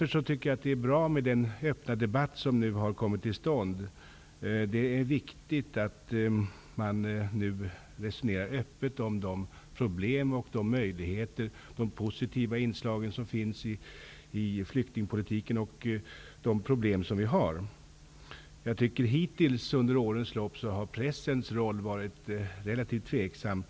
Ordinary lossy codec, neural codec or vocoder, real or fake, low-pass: none; none; real; none